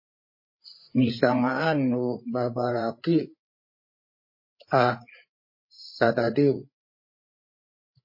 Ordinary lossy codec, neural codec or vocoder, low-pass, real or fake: MP3, 24 kbps; codec, 16 kHz, 8 kbps, FreqCodec, larger model; 5.4 kHz; fake